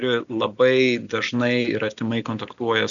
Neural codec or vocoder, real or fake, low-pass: none; real; 7.2 kHz